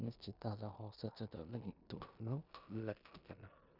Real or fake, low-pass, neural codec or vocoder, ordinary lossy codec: fake; 5.4 kHz; codec, 16 kHz in and 24 kHz out, 0.9 kbps, LongCat-Audio-Codec, four codebook decoder; none